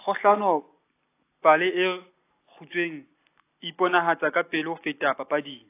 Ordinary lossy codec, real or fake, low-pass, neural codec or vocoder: AAC, 24 kbps; real; 3.6 kHz; none